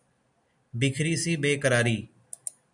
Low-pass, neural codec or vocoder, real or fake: 10.8 kHz; none; real